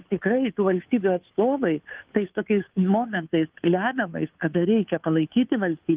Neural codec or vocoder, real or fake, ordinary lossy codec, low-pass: codec, 16 kHz, 2 kbps, FunCodec, trained on Chinese and English, 25 frames a second; fake; Opus, 32 kbps; 3.6 kHz